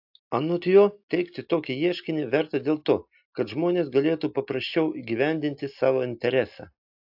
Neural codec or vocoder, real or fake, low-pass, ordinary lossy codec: none; real; 5.4 kHz; AAC, 48 kbps